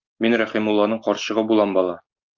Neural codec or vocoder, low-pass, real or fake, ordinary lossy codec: none; 7.2 kHz; real; Opus, 24 kbps